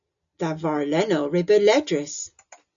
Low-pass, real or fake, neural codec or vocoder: 7.2 kHz; real; none